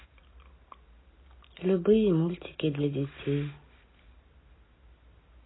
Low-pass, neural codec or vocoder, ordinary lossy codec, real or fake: 7.2 kHz; none; AAC, 16 kbps; real